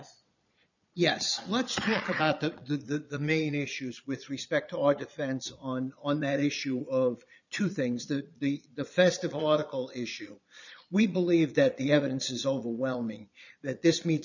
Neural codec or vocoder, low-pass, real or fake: vocoder, 22.05 kHz, 80 mel bands, Vocos; 7.2 kHz; fake